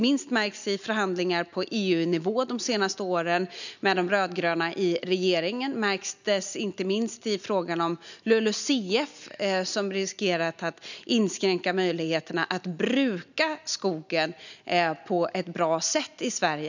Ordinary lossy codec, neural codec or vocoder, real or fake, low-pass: none; none; real; 7.2 kHz